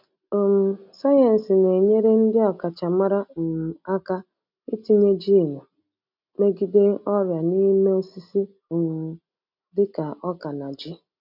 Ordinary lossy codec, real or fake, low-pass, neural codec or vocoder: none; real; 5.4 kHz; none